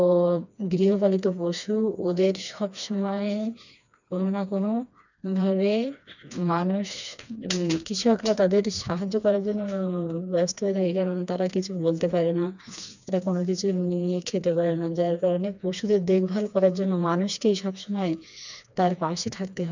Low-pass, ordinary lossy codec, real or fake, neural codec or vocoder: 7.2 kHz; none; fake; codec, 16 kHz, 2 kbps, FreqCodec, smaller model